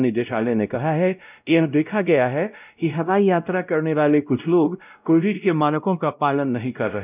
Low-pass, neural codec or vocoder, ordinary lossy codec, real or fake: 3.6 kHz; codec, 16 kHz, 0.5 kbps, X-Codec, WavLM features, trained on Multilingual LibriSpeech; none; fake